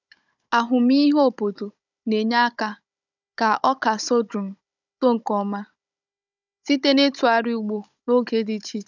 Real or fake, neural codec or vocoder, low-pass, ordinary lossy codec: fake; codec, 16 kHz, 16 kbps, FunCodec, trained on Chinese and English, 50 frames a second; 7.2 kHz; none